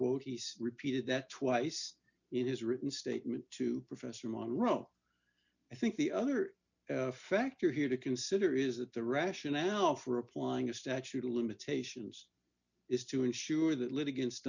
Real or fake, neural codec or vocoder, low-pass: real; none; 7.2 kHz